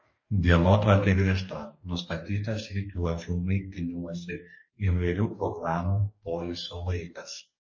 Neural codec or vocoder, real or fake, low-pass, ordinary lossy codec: codec, 44.1 kHz, 2.6 kbps, DAC; fake; 7.2 kHz; MP3, 32 kbps